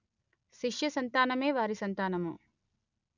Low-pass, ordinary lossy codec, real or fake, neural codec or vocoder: 7.2 kHz; none; real; none